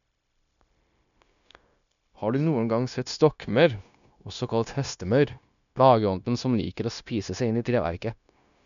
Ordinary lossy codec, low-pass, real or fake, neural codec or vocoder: none; 7.2 kHz; fake; codec, 16 kHz, 0.9 kbps, LongCat-Audio-Codec